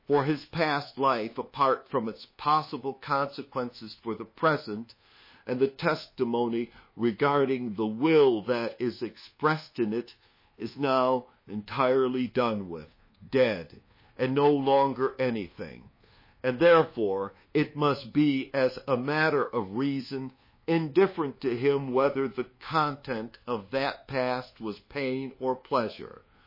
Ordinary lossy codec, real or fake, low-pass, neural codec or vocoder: MP3, 24 kbps; fake; 5.4 kHz; codec, 24 kHz, 1.2 kbps, DualCodec